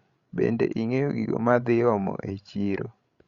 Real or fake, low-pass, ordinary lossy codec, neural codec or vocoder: fake; 7.2 kHz; Opus, 64 kbps; codec, 16 kHz, 16 kbps, FreqCodec, larger model